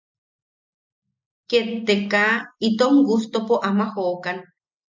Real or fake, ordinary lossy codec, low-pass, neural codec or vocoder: real; MP3, 64 kbps; 7.2 kHz; none